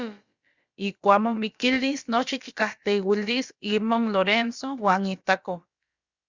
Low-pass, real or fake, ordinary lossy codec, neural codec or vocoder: 7.2 kHz; fake; Opus, 64 kbps; codec, 16 kHz, about 1 kbps, DyCAST, with the encoder's durations